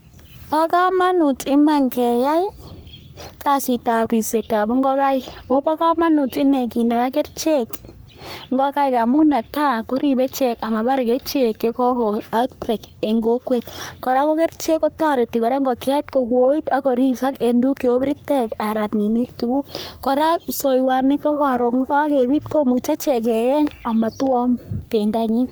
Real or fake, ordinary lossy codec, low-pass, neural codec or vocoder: fake; none; none; codec, 44.1 kHz, 3.4 kbps, Pupu-Codec